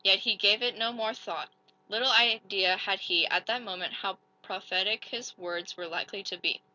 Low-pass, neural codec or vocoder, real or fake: 7.2 kHz; none; real